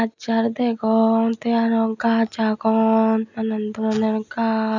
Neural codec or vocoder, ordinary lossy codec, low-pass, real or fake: none; none; 7.2 kHz; real